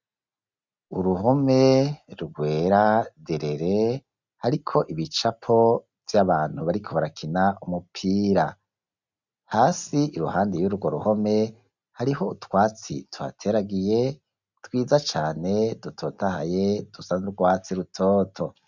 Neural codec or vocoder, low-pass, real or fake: none; 7.2 kHz; real